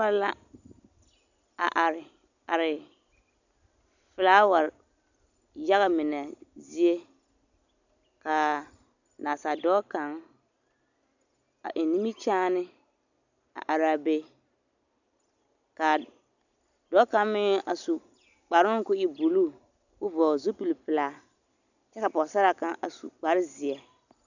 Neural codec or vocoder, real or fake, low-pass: none; real; 7.2 kHz